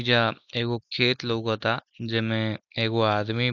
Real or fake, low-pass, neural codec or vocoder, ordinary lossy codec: real; 7.2 kHz; none; none